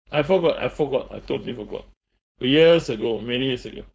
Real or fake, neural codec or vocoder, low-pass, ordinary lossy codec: fake; codec, 16 kHz, 4.8 kbps, FACodec; none; none